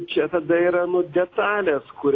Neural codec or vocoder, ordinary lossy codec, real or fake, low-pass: none; AAC, 32 kbps; real; 7.2 kHz